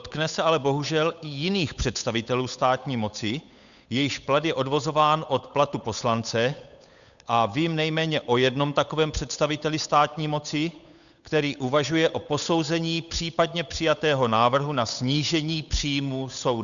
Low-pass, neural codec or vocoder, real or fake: 7.2 kHz; codec, 16 kHz, 8 kbps, FunCodec, trained on Chinese and English, 25 frames a second; fake